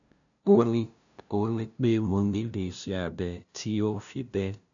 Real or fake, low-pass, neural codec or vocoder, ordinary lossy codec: fake; 7.2 kHz; codec, 16 kHz, 0.5 kbps, FunCodec, trained on LibriTTS, 25 frames a second; none